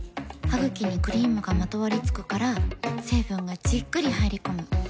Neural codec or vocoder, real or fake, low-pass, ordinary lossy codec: none; real; none; none